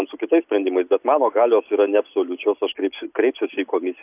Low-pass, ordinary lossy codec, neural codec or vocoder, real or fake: 3.6 kHz; AAC, 32 kbps; none; real